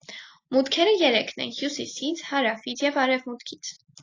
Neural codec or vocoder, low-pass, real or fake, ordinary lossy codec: none; 7.2 kHz; real; AAC, 32 kbps